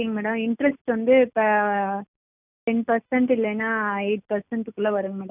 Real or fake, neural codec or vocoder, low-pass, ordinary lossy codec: real; none; 3.6 kHz; none